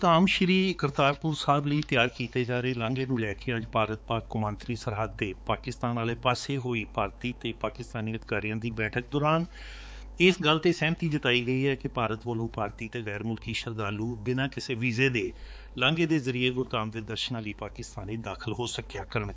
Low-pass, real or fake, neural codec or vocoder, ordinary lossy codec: none; fake; codec, 16 kHz, 4 kbps, X-Codec, HuBERT features, trained on balanced general audio; none